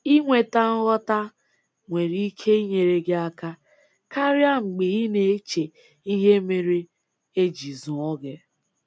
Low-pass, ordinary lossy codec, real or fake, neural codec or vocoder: none; none; real; none